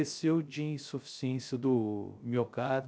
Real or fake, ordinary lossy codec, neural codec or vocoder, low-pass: fake; none; codec, 16 kHz, 0.3 kbps, FocalCodec; none